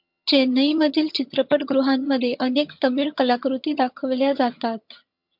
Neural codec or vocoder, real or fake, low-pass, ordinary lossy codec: vocoder, 22.05 kHz, 80 mel bands, HiFi-GAN; fake; 5.4 kHz; MP3, 48 kbps